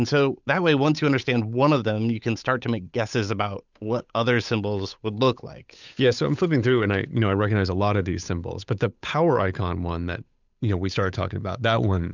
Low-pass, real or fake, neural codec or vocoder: 7.2 kHz; fake; codec, 16 kHz, 8 kbps, FunCodec, trained on Chinese and English, 25 frames a second